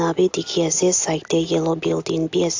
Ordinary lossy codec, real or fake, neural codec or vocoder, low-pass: AAC, 48 kbps; real; none; 7.2 kHz